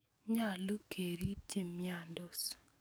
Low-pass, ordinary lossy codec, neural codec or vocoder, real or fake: none; none; codec, 44.1 kHz, 7.8 kbps, DAC; fake